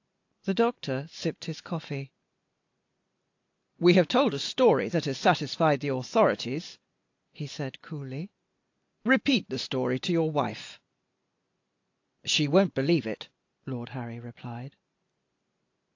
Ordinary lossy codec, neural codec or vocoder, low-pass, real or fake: AAC, 48 kbps; none; 7.2 kHz; real